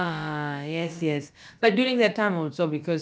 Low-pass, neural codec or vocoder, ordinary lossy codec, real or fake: none; codec, 16 kHz, about 1 kbps, DyCAST, with the encoder's durations; none; fake